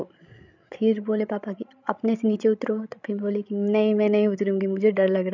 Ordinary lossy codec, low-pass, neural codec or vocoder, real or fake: none; 7.2 kHz; codec, 16 kHz, 16 kbps, FreqCodec, larger model; fake